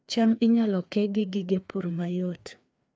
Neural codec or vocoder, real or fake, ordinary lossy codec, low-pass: codec, 16 kHz, 2 kbps, FreqCodec, larger model; fake; none; none